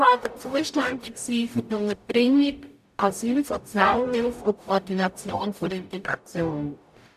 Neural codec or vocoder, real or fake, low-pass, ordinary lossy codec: codec, 44.1 kHz, 0.9 kbps, DAC; fake; 14.4 kHz; none